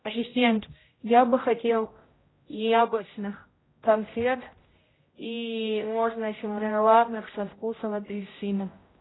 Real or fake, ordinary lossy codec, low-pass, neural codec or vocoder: fake; AAC, 16 kbps; 7.2 kHz; codec, 16 kHz, 0.5 kbps, X-Codec, HuBERT features, trained on general audio